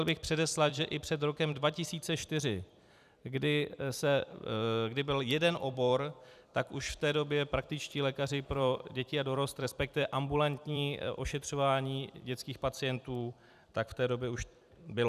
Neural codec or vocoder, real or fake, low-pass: vocoder, 44.1 kHz, 128 mel bands every 256 samples, BigVGAN v2; fake; 14.4 kHz